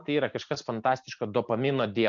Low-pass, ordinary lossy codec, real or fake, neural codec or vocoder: 7.2 kHz; MP3, 96 kbps; real; none